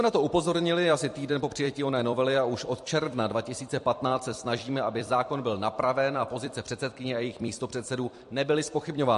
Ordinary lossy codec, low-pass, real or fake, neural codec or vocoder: MP3, 48 kbps; 14.4 kHz; fake; vocoder, 44.1 kHz, 128 mel bands every 256 samples, BigVGAN v2